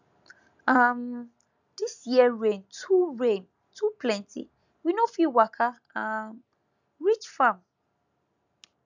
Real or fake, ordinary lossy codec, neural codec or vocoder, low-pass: real; none; none; 7.2 kHz